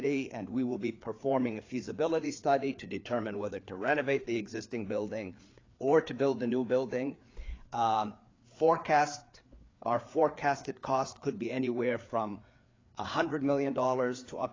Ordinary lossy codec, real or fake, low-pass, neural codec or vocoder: AAC, 32 kbps; fake; 7.2 kHz; codec, 16 kHz, 4 kbps, FreqCodec, larger model